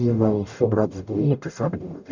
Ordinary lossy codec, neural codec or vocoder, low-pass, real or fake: none; codec, 44.1 kHz, 0.9 kbps, DAC; 7.2 kHz; fake